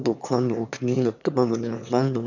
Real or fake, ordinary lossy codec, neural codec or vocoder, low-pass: fake; none; autoencoder, 22.05 kHz, a latent of 192 numbers a frame, VITS, trained on one speaker; 7.2 kHz